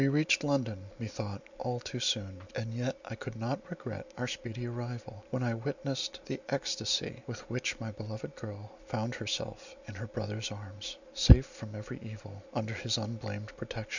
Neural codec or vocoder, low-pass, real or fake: none; 7.2 kHz; real